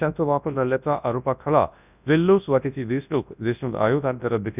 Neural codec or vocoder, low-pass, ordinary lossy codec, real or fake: codec, 24 kHz, 0.9 kbps, WavTokenizer, large speech release; 3.6 kHz; none; fake